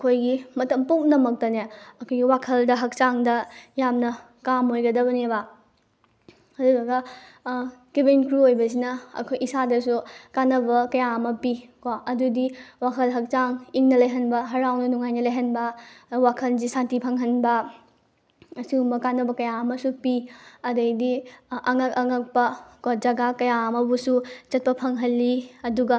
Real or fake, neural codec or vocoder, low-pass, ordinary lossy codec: real; none; none; none